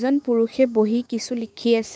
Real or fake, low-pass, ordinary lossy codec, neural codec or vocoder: fake; none; none; codec, 16 kHz, 6 kbps, DAC